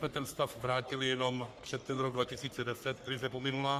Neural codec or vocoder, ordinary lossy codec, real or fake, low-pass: codec, 44.1 kHz, 3.4 kbps, Pupu-Codec; Opus, 64 kbps; fake; 14.4 kHz